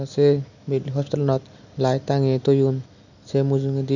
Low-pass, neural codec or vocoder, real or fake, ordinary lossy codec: 7.2 kHz; none; real; none